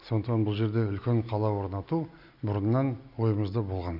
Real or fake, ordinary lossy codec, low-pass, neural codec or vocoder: real; none; 5.4 kHz; none